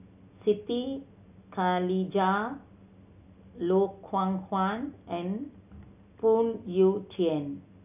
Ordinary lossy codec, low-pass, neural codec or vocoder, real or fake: none; 3.6 kHz; none; real